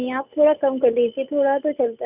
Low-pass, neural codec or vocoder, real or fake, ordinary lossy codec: 3.6 kHz; none; real; none